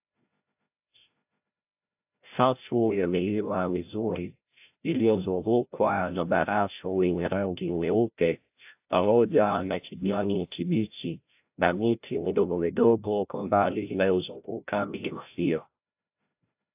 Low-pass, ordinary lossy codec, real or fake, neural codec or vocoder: 3.6 kHz; AAC, 32 kbps; fake; codec, 16 kHz, 0.5 kbps, FreqCodec, larger model